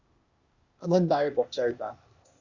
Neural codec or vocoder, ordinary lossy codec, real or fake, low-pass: codec, 16 kHz, 0.8 kbps, ZipCodec; Opus, 64 kbps; fake; 7.2 kHz